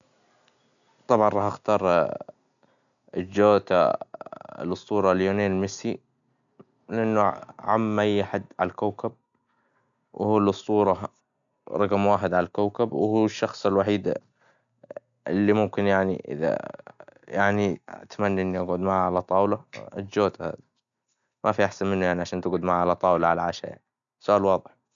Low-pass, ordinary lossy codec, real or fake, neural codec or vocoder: 7.2 kHz; none; real; none